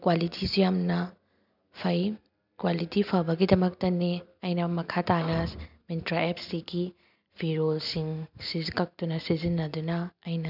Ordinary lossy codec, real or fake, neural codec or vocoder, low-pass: none; real; none; 5.4 kHz